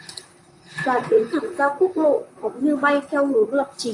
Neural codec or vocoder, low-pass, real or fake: vocoder, 44.1 kHz, 128 mel bands, Pupu-Vocoder; 10.8 kHz; fake